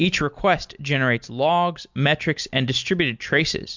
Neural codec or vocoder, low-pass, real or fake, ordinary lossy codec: none; 7.2 kHz; real; MP3, 64 kbps